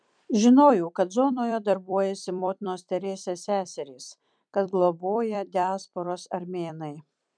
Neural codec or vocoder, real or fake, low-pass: vocoder, 44.1 kHz, 128 mel bands every 256 samples, BigVGAN v2; fake; 9.9 kHz